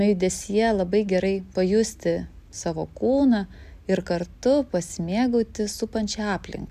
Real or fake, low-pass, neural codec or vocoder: real; 14.4 kHz; none